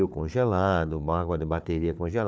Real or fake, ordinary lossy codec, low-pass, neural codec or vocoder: fake; none; none; codec, 16 kHz, 4 kbps, FunCodec, trained on Chinese and English, 50 frames a second